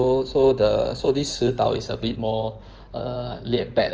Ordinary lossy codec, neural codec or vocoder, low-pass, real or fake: Opus, 24 kbps; codec, 16 kHz in and 24 kHz out, 2.2 kbps, FireRedTTS-2 codec; 7.2 kHz; fake